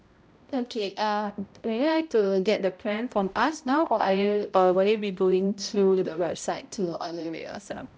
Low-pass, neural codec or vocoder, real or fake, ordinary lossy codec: none; codec, 16 kHz, 0.5 kbps, X-Codec, HuBERT features, trained on balanced general audio; fake; none